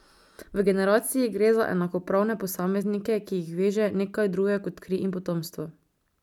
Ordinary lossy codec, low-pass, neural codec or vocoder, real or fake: none; 19.8 kHz; none; real